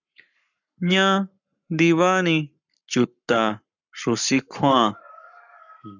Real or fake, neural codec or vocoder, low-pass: fake; codec, 44.1 kHz, 7.8 kbps, Pupu-Codec; 7.2 kHz